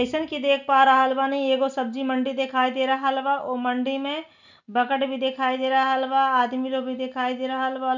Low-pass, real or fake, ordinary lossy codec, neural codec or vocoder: 7.2 kHz; real; none; none